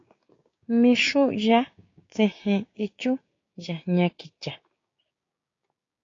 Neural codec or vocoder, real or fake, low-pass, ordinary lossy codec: codec, 16 kHz, 6 kbps, DAC; fake; 7.2 kHz; AAC, 32 kbps